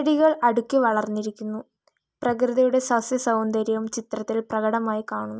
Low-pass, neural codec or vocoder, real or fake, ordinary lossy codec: none; none; real; none